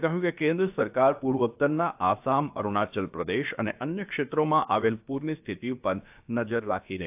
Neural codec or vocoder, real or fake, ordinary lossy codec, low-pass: codec, 16 kHz, about 1 kbps, DyCAST, with the encoder's durations; fake; AAC, 32 kbps; 3.6 kHz